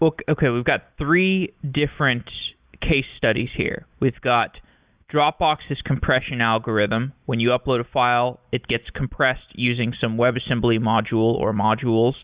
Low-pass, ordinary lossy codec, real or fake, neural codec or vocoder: 3.6 kHz; Opus, 64 kbps; real; none